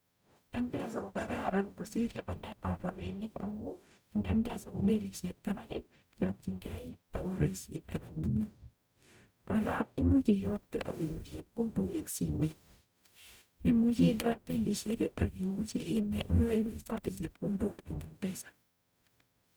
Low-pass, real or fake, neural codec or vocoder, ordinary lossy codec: none; fake; codec, 44.1 kHz, 0.9 kbps, DAC; none